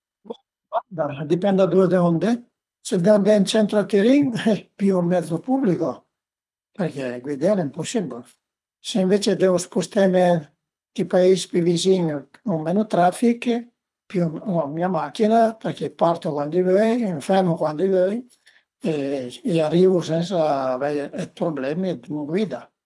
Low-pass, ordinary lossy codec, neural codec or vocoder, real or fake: none; none; codec, 24 kHz, 3 kbps, HILCodec; fake